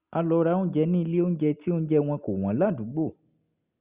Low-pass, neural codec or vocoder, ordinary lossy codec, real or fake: 3.6 kHz; none; Opus, 64 kbps; real